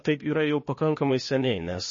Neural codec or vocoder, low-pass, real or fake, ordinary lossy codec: codec, 16 kHz, 0.8 kbps, ZipCodec; 7.2 kHz; fake; MP3, 32 kbps